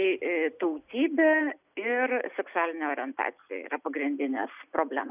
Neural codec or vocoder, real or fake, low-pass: none; real; 3.6 kHz